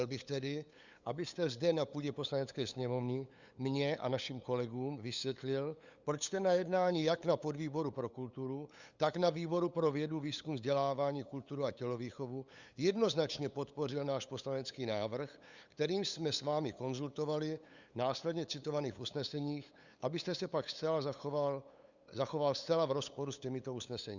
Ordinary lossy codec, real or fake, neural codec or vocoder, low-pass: Opus, 64 kbps; fake; codec, 16 kHz, 8 kbps, FunCodec, trained on LibriTTS, 25 frames a second; 7.2 kHz